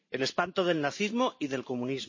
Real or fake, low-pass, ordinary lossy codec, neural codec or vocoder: fake; 7.2 kHz; MP3, 32 kbps; vocoder, 44.1 kHz, 128 mel bands every 512 samples, BigVGAN v2